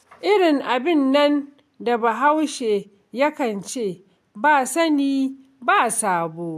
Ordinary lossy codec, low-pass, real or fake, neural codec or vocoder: AAC, 96 kbps; 14.4 kHz; real; none